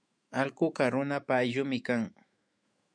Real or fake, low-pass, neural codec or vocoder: fake; 9.9 kHz; autoencoder, 48 kHz, 128 numbers a frame, DAC-VAE, trained on Japanese speech